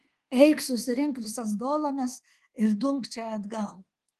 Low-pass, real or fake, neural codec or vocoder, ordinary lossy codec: 10.8 kHz; fake; codec, 24 kHz, 1.2 kbps, DualCodec; Opus, 16 kbps